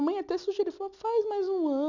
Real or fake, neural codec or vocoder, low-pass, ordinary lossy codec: real; none; 7.2 kHz; none